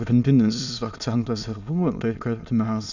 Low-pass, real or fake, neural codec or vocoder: 7.2 kHz; fake; autoencoder, 22.05 kHz, a latent of 192 numbers a frame, VITS, trained on many speakers